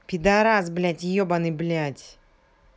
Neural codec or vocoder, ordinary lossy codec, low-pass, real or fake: none; none; none; real